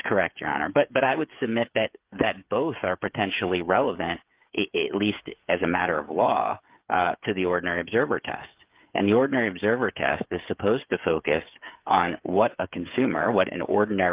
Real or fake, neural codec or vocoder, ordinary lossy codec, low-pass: fake; codec, 16 kHz, 8 kbps, FreqCodec, smaller model; Opus, 32 kbps; 3.6 kHz